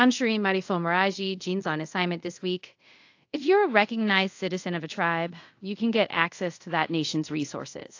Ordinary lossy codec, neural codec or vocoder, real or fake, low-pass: AAC, 48 kbps; codec, 24 kHz, 0.5 kbps, DualCodec; fake; 7.2 kHz